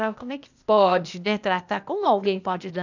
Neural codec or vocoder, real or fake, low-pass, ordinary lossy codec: codec, 16 kHz, 0.8 kbps, ZipCodec; fake; 7.2 kHz; none